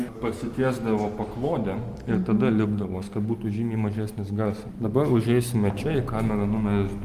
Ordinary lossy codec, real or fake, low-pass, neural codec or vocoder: Opus, 32 kbps; real; 14.4 kHz; none